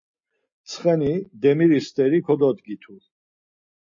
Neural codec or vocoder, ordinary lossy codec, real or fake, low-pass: none; MP3, 32 kbps; real; 7.2 kHz